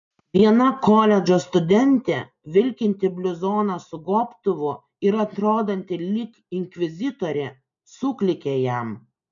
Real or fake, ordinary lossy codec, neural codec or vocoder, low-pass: real; MP3, 96 kbps; none; 7.2 kHz